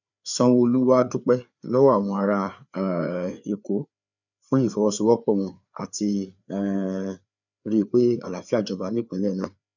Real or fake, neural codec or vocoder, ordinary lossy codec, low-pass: fake; codec, 16 kHz, 4 kbps, FreqCodec, larger model; none; 7.2 kHz